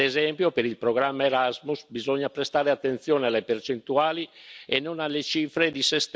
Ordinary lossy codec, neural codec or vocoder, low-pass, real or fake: none; none; none; real